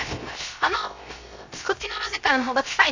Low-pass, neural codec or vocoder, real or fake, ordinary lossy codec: 7.2 kHz; codec, 16 kHz, 0.3 kbps, FocalCodec; fake; MP3, 64 kbps